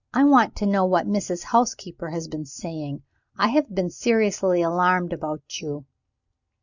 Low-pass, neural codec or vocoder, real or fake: 7.2 kHz; none; real